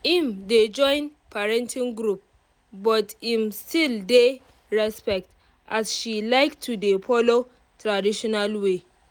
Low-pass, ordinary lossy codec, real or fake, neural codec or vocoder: none; none; real; none